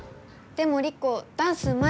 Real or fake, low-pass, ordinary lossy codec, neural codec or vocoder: real; none; none; none